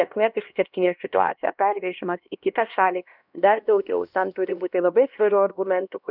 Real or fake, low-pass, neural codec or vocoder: fake; 5.4 kHz; codec, 16 kHz, 1 kbps, X-Codec, HuBERT features, trained on LibriSpeech